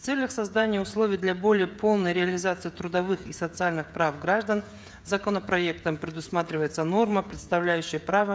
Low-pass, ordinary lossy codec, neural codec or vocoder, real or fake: none; none; codec, 16 kHz, 16 kbps, FreqCodec, smaller model; fake